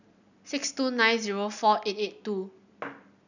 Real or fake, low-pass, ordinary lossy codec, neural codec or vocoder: real; 7.2 kHz; none; none